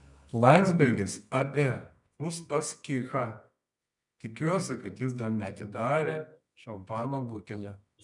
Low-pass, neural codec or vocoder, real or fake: 10.8 kHz; codec, 24 kHz, 0.9 kbps, WavTokenizer, medium music audio release; fake